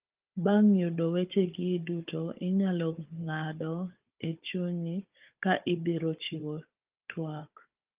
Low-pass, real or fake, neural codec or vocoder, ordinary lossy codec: 3.6 kHz; fake; codec, 16 kHz, 16 kbps, FunCodec, trained on Chinese and English, 50 frames a second; Opus, 32 kbps